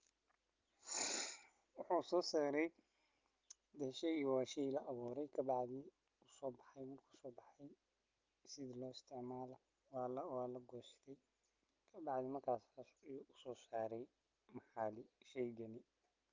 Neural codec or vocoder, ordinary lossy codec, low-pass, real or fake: none; Opus, 24 kbps; 7.2 kHz; real